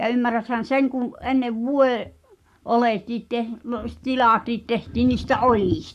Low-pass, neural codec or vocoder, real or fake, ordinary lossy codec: 14.4 kHz; codec, 44.1 kHz, 7.8 kbps, Pupu-Codec; fake; none